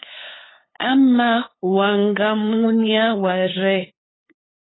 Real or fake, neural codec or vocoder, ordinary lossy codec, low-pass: fake; codec, 16 kHz, 8 kbps, FunCodec, trained on LibriTTS, 25 frames a second; AAC, 16 kbps; 7.2 kHz